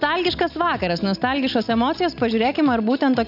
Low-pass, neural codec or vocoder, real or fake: 5.4 kHz; none; real